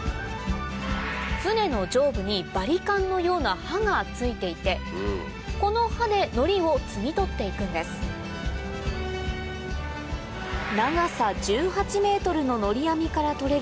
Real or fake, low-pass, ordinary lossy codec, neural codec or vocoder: real; none; none; none